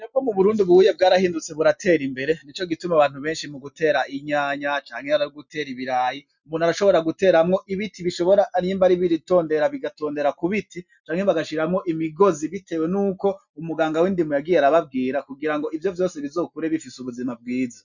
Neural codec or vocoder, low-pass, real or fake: none; 7.2 kHz; real